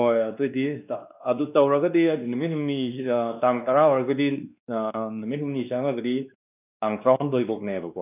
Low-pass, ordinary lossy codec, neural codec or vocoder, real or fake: 3.6 kHz; none; codec, 16 kHz, 2 kbps, X-Codec, WavLM features, trained on Multilingual LibriSpeech; fake